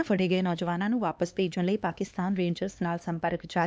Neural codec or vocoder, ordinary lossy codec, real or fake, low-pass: codec, 16 kHz, 2 kbps, X-Codec, HuBERT features, trained on LibriSpeech; none; fake; none